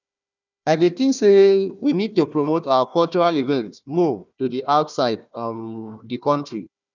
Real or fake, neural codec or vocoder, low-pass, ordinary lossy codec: fake; codec, 16 kHz, 1 kbps, FunCodec, trained on Chinese and English, 50 frames a second; 7.2 kHz; none